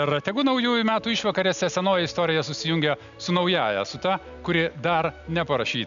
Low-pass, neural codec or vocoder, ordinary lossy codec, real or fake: 7.2 kHz; none; MP3, 96 kbps; real